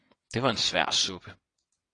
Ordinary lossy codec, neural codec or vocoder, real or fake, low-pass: AAC, 32 kbps; vocoder, 22.05 kHz, 80 mel bands, WaveNeXt; fake; 9.9 kHz